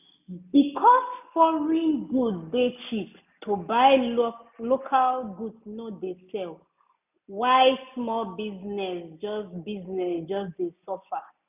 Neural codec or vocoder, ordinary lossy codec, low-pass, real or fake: none; none; 3.6 kHz; real